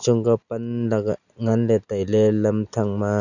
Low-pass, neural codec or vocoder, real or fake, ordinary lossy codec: 7.2 kHz; none; real; none